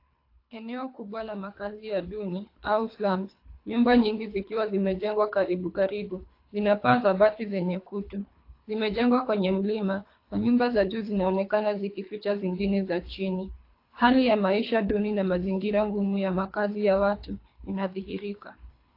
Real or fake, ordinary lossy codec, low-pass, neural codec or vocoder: fake; AAC, 32 kbps; 5.4 kHz; codec, 24 kHz, 3 kbps, HILCodec